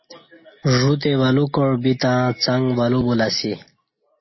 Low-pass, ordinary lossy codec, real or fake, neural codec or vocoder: 7.2 kHz; MP3, 24 kbps; real; none